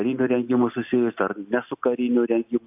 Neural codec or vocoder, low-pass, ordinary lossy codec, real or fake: none; 3.6 kHz; AAC, 32 kbps; real